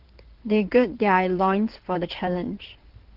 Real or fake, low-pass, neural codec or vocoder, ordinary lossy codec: fake; 5.4 kHz; codec, 16 kHz in and 24 kHz out, 2.2 kbps, FireRedTTS-2 codec; Opus, 16 kbps